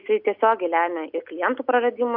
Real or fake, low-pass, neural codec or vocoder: real; 5.4 kHz; none